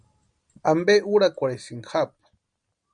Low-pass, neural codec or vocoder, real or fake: 9.9 kHz; none; real